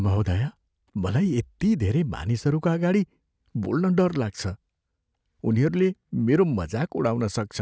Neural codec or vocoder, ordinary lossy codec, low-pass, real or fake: none; none; none; real